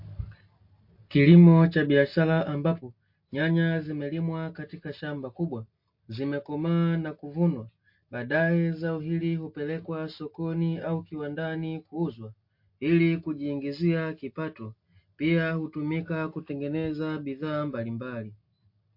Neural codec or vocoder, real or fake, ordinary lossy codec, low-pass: none; real; MP3, 32 kbps; 5.4 kHz